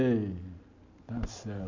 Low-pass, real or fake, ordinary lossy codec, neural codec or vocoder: 7.2 kHz; real; MP3, 64 kbps; none